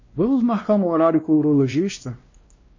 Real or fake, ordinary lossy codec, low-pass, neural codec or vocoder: fake; MP3, 32 kbps; 7.2 kHz; codec, 16 kHz, 1 kbps, X-Codec, WavLM features, trained on Multilingual LibriSpeech